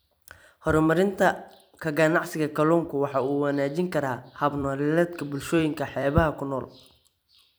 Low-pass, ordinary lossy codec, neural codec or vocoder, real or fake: none; none; vocoder, 44.1 kHz, 128 mel bands every 256 samples, BigVGAN v2; fake